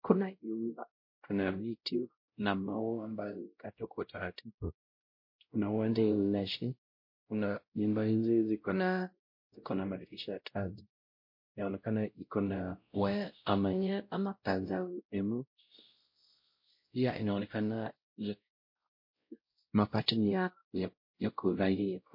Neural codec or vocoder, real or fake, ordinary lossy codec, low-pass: codec, 16 kHz, 0.5 kbps, X-Codec, WavLM features, trained on Multilingual LibriSpeech; fake; MP3, 32 kbps; 5.4 kHz